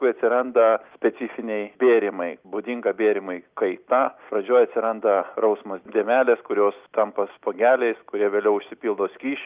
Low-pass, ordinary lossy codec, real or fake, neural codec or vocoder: 3.6 kHz; Opus, 24 kbps; real; none